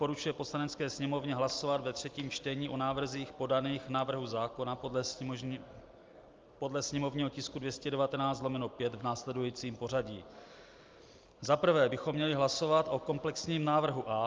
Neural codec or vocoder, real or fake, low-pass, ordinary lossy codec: none; real; 7.2 kHz; Opus, 24 kbps